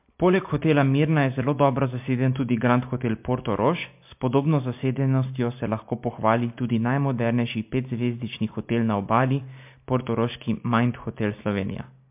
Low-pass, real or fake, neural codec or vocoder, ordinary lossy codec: 3.6 kHz; real; none; MP3, 32 kbps